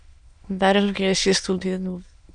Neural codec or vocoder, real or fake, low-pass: autoencoder, 22.05 kHz, a latent of 192 numbers a frame, VITS, trained on many speakers; fake; 9.9 kHz